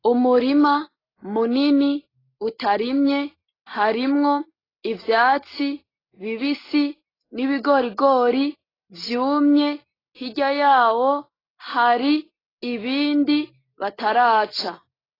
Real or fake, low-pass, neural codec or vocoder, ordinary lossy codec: real; 5.4 kHz; none; AAC, 24 kbps